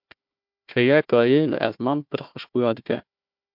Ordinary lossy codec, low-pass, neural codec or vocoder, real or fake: MP3, 48 kbps; 5.4 kHz; codec, 16 kHz, 1 kbps, FunCodec, trained on Chinese and English, 50 frames a second; fake